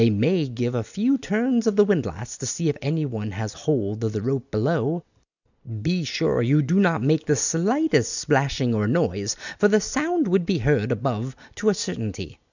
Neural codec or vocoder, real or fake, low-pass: none; real; 7.2 kHz